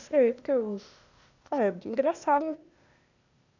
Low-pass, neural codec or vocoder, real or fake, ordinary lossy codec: 7.2 kHz; codec, 16 kHz, 0.8 kbps, ZipCodec; fake; none